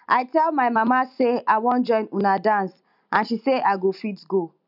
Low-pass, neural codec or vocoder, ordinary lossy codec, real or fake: 5.4 kHz; none; none; real